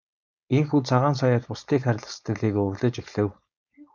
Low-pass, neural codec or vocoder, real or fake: 7.2 kHz; codec, 16 kHz, 4.8 kbps, FACodec; fake